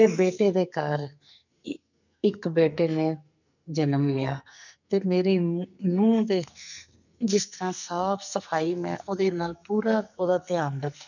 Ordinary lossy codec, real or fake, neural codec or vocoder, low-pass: none; fake; codec, 44.1 kHz, 2.6 kbps, SNAC; 7.2 kHz